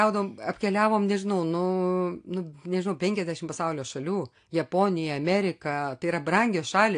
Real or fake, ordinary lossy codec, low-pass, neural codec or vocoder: real; AAC, 48 kbps; 9.9 kHz; none